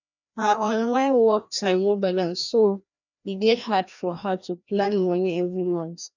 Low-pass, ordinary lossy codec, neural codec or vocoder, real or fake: 7.2 kHz; none; codec, 16 kHz, 1 kbps, FreqCodec, larger model; fake